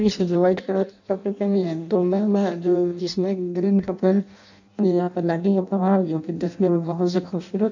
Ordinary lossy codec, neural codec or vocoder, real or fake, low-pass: none; codec, 16 kHz in and 24 kHz out, 0.6 kbps, FireRedTTS-2 codec; fake; 7.2 kHz